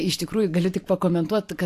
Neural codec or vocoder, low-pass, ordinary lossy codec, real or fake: vocoder, 44.1 kHz, 128 mel bands every 512 samples, BigVGAN v2; 14.4 kHz; AAC, 64 kbps; fake